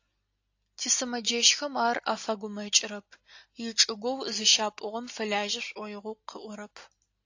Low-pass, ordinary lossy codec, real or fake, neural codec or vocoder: 7.2 kHz; AAC, 48 kbps; real; none